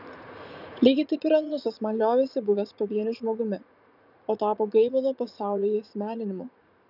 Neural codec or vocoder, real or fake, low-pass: vocoder, 44.1 kHz, 80 mel bands, Vocos; fake; 5.4 kHz